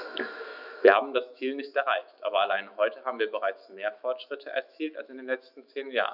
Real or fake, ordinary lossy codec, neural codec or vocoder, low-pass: fake; none; autoencoder, 48 kHz, 128 numbers a frame, DAC-VAE, trained on Japanese speech; 5.4 kHz